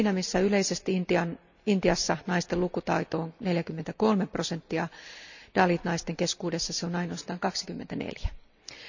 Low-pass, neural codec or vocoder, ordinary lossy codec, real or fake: 7.2 kHz; none; none; real